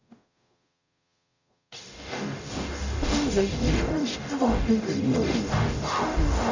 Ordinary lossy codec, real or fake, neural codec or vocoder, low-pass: none; fake; codec, 44.1 kHz, 0.9 kbps, DAC; 7.2 kHz